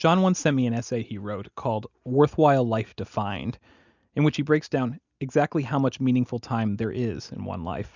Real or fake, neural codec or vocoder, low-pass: real; none; 7.2 kHz